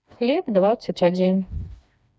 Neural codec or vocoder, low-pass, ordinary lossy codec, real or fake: codec, 16 kHz, 2 kbps, FreqCodec, smaller model; none; none; fake